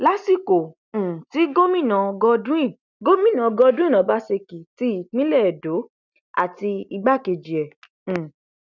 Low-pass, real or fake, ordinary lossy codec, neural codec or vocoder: 7.2 kHz; real; none; none